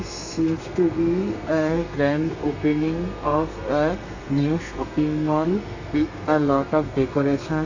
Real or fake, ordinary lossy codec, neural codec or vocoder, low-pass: fake; AAC, 48 kbps; codec, 32 kHz, 1.9 kbps, SNAC; 7.2 kHz